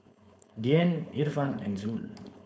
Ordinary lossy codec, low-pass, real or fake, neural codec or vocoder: none; none; fake; codec, 16 kHz, 4.8 kbps, FACodec